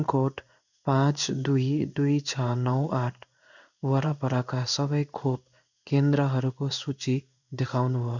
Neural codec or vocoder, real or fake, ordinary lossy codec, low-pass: codec, 16 kHz in and 24 kHz out, 1 kbps, XY-Tokenizer; fake; none; 7.2 kHz